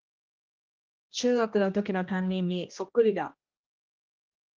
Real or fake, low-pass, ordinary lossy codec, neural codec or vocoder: fake; 7.2 kHz; Opus, 32 kbps; codec, 16 kHz, 1 kbps, X-Codec, HuBERT features, trained on general audio